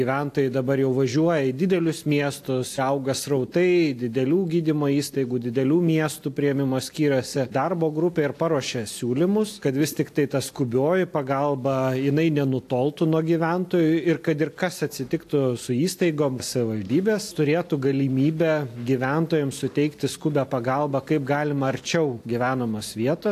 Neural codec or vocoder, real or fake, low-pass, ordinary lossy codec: none; real; 14.4 kHz; AAC, 64 kbps